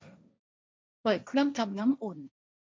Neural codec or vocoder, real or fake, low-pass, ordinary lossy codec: codec, 16 kHz, 1.1 kbps, Voila-Tokenizer; fake; none; none